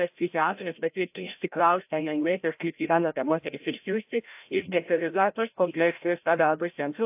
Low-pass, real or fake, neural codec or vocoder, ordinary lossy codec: 3.6 kHz; fake; codec, 16 kHz, 0.5 kbps, FreqCodec, larger model; AAC, 32 kbps